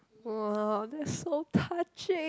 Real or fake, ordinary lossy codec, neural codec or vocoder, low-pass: real; none; none; none